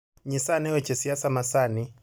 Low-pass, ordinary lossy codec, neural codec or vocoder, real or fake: none; none; none; real